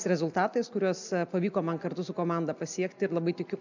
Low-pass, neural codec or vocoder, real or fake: 7.2 kHz; none; real